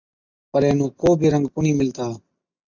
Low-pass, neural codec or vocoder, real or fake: 7.2 kHz; none; real